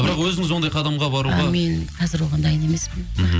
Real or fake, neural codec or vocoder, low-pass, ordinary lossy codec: real; none; none; none